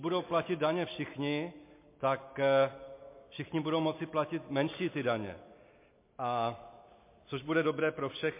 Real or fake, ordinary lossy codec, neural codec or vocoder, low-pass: real; MP3, 24 kbps; none; 3.6 kHz